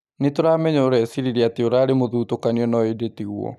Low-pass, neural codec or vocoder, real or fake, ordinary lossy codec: 14.4 kHz; none; real; none